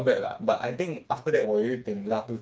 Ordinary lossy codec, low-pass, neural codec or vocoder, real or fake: none; none; codec, 16 kHz, 2 kbps, FreqCodec, smaller model; fake